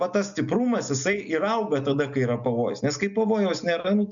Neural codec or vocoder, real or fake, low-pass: none; real; 7.2 kHz